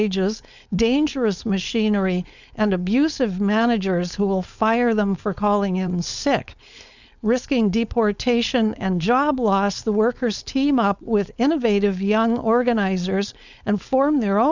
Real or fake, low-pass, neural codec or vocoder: fake; 7.2 kHz; codec, 16 kHz, 4.8 kbps, FACodec